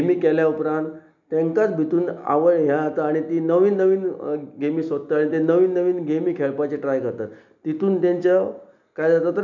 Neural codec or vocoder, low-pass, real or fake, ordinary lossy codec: none; 7.2 kHz; real; none